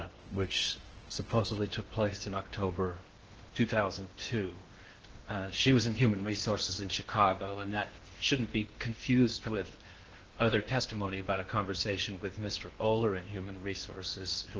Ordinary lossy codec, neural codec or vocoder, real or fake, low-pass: Opus, 16 kbps; codec, 16 kHz in and 24 kHz out, 0.8 kbps, FocalCodec, streaming, 65536 codes; fake; 7.2 kHz